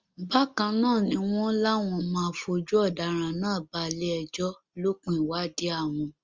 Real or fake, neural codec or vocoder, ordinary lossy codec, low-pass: real; none; Opus, 32 kbps; 7.2 kHz